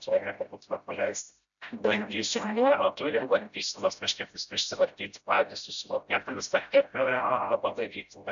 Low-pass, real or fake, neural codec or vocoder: 7.2 kHz; fake; codec, 16 kHz, 0.5 kbps, FreqCodec, smaller model